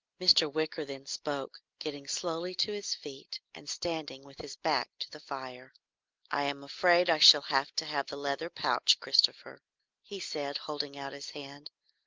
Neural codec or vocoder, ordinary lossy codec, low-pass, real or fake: none; Opus, 32 kbps; 7.2 kHz; real